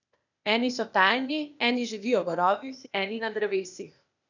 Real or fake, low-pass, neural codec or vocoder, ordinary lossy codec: fake; 7.2 kHz; codec, 16 kHz, 0.8 kbps, ZipCodec; none